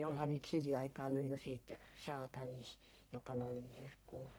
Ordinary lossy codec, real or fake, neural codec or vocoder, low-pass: none; fake; codec, 44.1 kHz, 1.7 kbps, Pupu-Codec; none